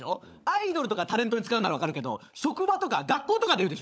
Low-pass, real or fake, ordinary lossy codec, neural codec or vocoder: none; fake; none; codec, 16 kHz, 16 kbps, FunCodec, trained on LibriTTS, 50 frames a second